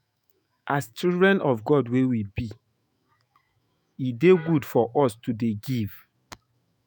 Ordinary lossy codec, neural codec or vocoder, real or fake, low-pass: none; autoencoder, 48 kHz, 128 numbers a frame, DAC-VAE, trained on Japanese speech; fake; none